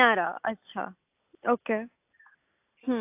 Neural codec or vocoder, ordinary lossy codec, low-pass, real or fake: none; AAC, 24 kbps; 3.6 kHz; real